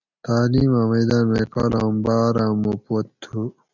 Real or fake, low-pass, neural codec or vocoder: real; 7.2 kHz; none